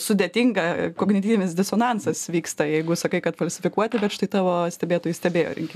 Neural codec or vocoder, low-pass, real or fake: none; 14.4 kHz; real